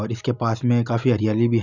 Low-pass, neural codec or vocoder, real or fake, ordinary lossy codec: 7.2 kHz; none; real; Opus, 64 kbps